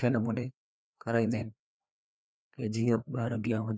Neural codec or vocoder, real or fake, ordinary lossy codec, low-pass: codec, 16 kHz, 2 kbps, FreqCodec, larger model; fake; none; none